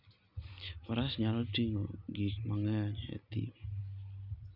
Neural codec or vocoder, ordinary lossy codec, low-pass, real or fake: none; none; 5.4 kHz; real